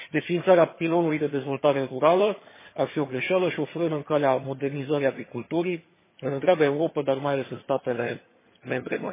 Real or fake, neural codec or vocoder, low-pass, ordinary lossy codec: fake; vocoder, 22.05 kHz, 80 mel bands, HiFi-GAN; 3.6 kHz; MP3, 16 kbps